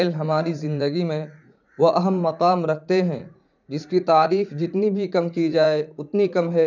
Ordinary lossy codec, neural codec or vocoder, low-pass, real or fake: none; vocoder, 44.1 kHz, 80 mel bands, Vocos; 7.2 kHz; fake